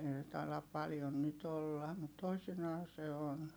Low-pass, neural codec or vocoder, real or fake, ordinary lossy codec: none; none; real; none